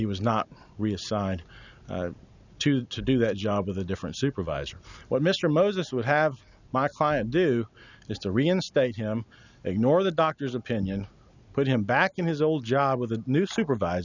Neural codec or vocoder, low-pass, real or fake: none; 7.2 kHz; real